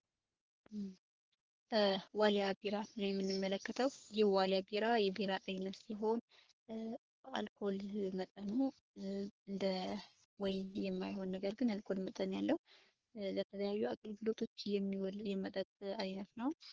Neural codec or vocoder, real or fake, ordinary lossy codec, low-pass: codec, 44.1 kHz, 3.4 kbps, Pupu-Codec; fake; Opus, 16 kbps; 7.2 kHz